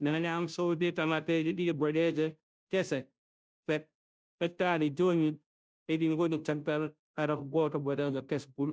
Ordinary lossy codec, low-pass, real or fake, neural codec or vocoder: none; none; fake; codec, 16 kHz, 0.5 kbps, FunCodec, trained on Chinese and English, 25 frames a second